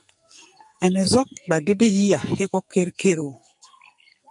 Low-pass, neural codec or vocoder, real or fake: 10.8 kHz; codec, 44.1 kHz, 2.6 kbps, SNAC; fake